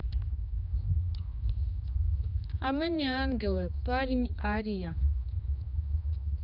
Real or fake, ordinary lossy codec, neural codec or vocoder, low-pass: fake; none; codec, 16 kHz, 2 kbps, X-Codec, HuBERT features, trained on general audio; 5.4 kHz